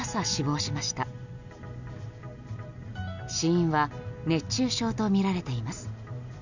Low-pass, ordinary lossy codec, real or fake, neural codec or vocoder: 7.2 kHz; none; real; none